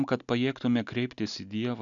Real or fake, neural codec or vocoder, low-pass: real; none; 7.2 kHz